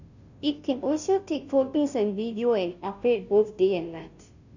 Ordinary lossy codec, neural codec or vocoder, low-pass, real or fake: none; codec, 16 kHz, 0.5 kbps, FunCodec, trained on Chinese and English, 25 frames a second; 7.2 kHz; fake